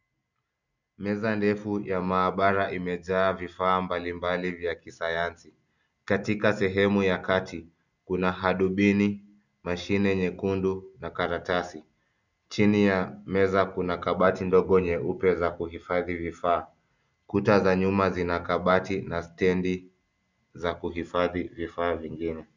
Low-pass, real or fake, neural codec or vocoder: 7.2 kHz; real; none